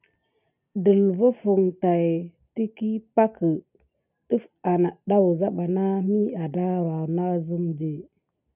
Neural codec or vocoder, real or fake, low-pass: none; real; 3.6 kHz